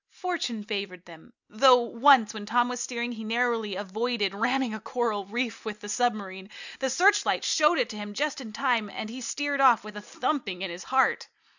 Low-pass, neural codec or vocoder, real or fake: 7.2 kHz; none; real